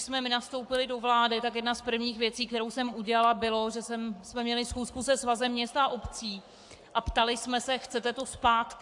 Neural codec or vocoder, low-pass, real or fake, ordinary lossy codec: codec, 44.1 kHz, 7.8 kbps, Pupu-Codec; 10.8 kHz; fake; AAC, 64 kbps